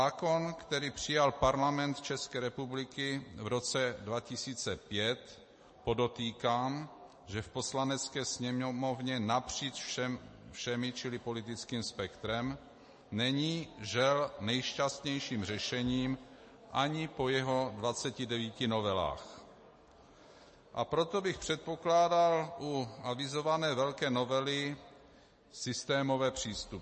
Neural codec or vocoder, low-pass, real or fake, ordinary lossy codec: none; 10.8 kHz; real; MP3, 32 kbps